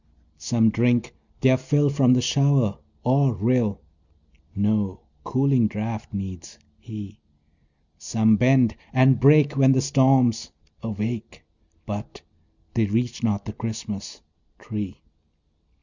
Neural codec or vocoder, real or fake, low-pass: none; real; 7.2 kHz